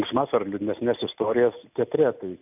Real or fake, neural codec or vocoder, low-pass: real; none; 3.6 kHz